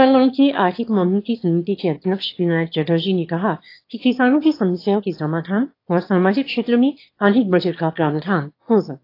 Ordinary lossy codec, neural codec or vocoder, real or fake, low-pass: AAC, 32 kbps; autoencoder, 22.05 kHz, a latent of 192 numbers a frame, VITS, trained on one speaker; fake; 5.4 kHz